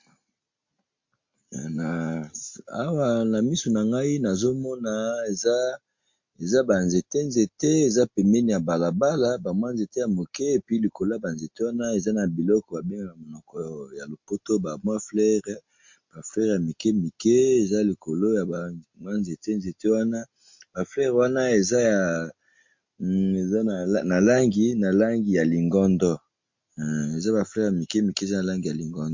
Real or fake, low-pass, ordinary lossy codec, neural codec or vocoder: real; 7.2 kHz; MP3, 48 kbps; none